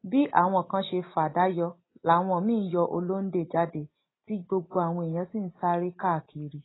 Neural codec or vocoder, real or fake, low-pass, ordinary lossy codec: none; real; 7.2 kHz; AAC, 16 kbps